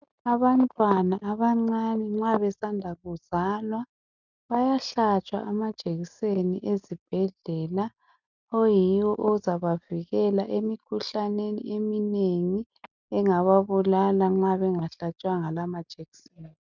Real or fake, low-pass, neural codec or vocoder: real; 7.2 kHz; none